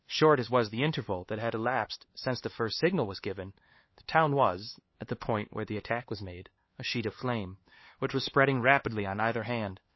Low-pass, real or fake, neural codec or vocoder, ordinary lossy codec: 7.2 kHz; fake; codec, 16 kHz, 4 kbps, X-Codec, HuBERT features, trained on LibriSpeech; MP3, 24 kbps